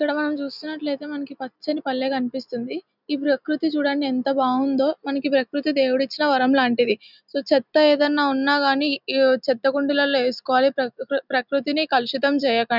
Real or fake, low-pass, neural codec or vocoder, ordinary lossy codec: real; 5.4 kHz; none; none